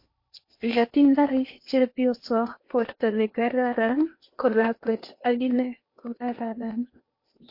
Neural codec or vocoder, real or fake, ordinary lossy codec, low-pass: codec, 16 kHz in and 24 kHz out, 0.8 kbps, FocalCodec, streaming, 65536 codes; fake; MP3, 32 kbps; 5.4 kHz